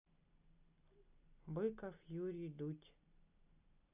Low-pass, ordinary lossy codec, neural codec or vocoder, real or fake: 3.6 kHz; AAC, 32 kbps; none; real